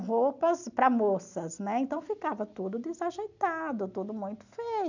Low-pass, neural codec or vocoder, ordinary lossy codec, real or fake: 7.2 kHz; none; none; real